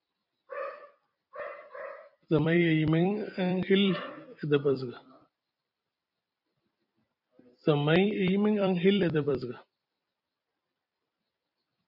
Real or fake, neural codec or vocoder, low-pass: fake; vocoder, 44.1 kHz, 128 mel bands every 256 samples, BigVGAN v2; 5.4 kHz